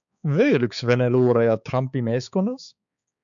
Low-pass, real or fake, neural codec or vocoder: 7.2 kHz; fake; codec, 16 kHz, 4 kbps, X-Codec, HuBERT features, trained on balanced general audio